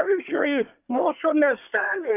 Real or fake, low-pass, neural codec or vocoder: fake; 3.6 kHz; codec, 24 kHz, 1 kbps, SNAC